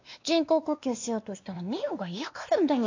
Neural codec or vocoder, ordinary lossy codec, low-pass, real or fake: codec, 16 kHz, 2 kbps, X-Codec, WavLM features, trained on Multilingual LibriSpeech; AAC, 48 kbps; 7.2 kHz; fake